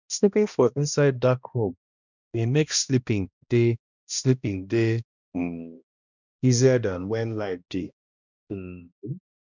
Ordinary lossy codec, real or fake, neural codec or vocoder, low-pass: none; fake; codec, 16 kHz, 1 kbps, X-Codec, HuBERT features, trained on balanced general audio; 7.2 kHz